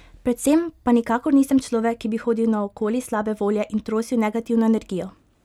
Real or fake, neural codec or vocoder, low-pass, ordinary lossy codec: real; none; 19.8 kHz; none